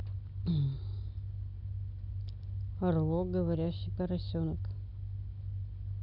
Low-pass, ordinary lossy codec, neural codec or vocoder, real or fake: 5.4 kHz; none; none; real